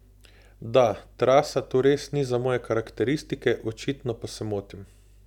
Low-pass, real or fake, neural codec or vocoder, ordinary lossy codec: 19.8 kHz; real; none; none